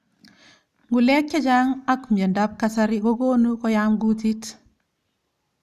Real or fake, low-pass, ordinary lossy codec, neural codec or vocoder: real; 14.4 kHz; none; none